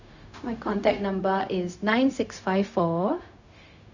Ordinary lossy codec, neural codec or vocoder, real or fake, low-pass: none; codec, 16 kHz, 0.4 kbps, LongCat-Audio-Codec; fake; 7.2 kHz